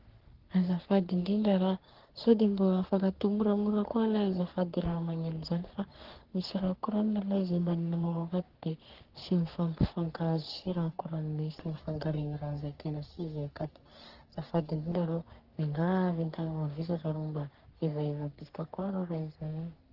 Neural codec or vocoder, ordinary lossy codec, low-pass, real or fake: codec, 44.1 kHz, 3.4 kbps, Pupu-Codec; Opus, 16 kbps; 5.4 kHz; fake